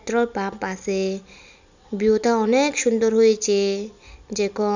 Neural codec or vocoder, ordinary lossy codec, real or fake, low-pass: none; none; real; 7.2 kHz